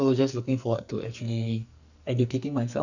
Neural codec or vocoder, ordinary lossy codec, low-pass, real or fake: codec, 44.1 kHz, 2.6 kbps, SNAC; none; 7.2 kHz; fake